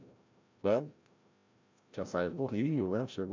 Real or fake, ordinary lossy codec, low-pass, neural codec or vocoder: fake; MP3, 64 kbps; 7.2 kHz; codec, 16 kHz, 0.5 kbps, FreqCodec, larger model